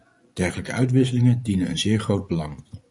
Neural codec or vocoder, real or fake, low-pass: vocoder, 24 kHz, 100 mel bands, Vocos; fake; 10.8 kHz